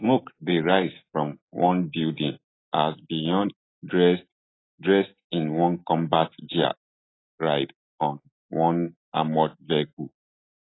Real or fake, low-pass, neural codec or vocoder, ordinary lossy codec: real; 7.2 kHz; none; AAC, 16 kbps